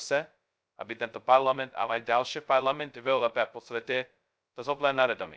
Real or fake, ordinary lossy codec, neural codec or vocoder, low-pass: fake; none; codec, 16 kHz, 0.2 kbps, FocalCodec; none